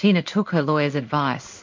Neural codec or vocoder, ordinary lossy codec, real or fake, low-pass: codec, 16 kHz in and 24 kHz out, 1 kbps, XY-Tokenizer; MP3, 48 kbps; fake; 7.2 kHz